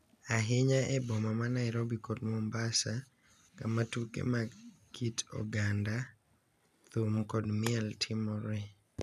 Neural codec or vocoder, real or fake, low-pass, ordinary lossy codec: none; real; 14.4 kHz; none